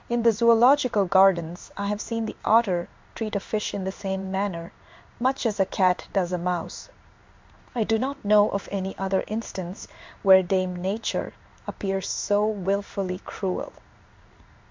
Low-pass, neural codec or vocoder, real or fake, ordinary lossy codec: 7.2 kHz; codec, 16 kHz in and 24 kHz out, 1 kbps, XY-Tokenizer; fake; MP3, 64 kbps